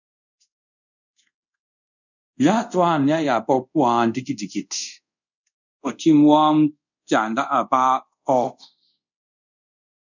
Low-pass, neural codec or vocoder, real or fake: 7.2 kHz; codec, 24 kHz, 0.5 kbps, DualCodec; fake